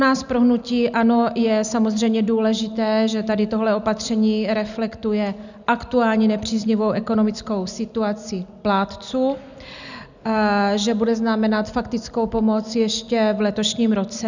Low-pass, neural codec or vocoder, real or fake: 7.2 kHz; none; real